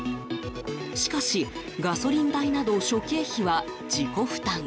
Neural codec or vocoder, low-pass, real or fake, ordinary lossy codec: none; none; real; none